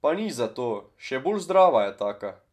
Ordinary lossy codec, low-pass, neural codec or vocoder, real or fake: none; 14.4 kHz; none; real